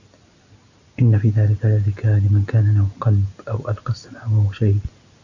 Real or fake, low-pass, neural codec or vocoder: real; 7.2 kHz; none